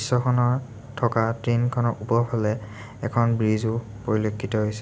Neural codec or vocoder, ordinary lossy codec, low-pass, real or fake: none; none; none; real